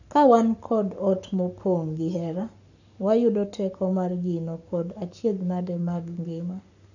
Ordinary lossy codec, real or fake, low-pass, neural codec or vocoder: none; fake; 7.2 kHz; codec, 44.1 kHz, 7.8 kbps, Pupu-Codec